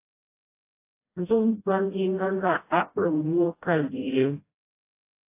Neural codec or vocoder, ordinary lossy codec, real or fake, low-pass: codec, 16 kHz, 0.5 kbps, FreqCodec, smaller model; AAC, 24 kbps; fake; 3.6 kHz